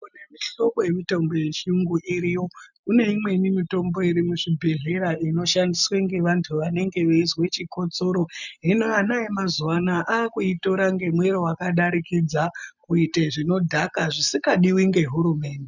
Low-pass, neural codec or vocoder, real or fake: 7.2 kHz; none; real